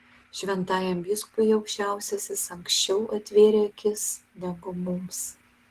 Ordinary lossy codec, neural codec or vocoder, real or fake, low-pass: Opus, 16 kbps; none; real; 14.4 kHz